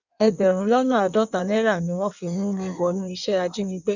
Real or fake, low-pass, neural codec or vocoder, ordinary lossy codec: fake; 7.2 kHz; codec, 16 kHz in and 24 kHz out, 1.1 kbps, FireRedTTS-2 codec; none